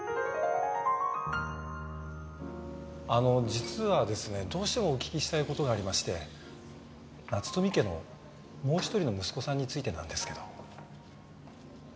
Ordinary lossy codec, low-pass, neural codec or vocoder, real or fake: none; none; none; real